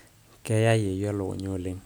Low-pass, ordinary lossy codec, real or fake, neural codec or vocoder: none; none; real; none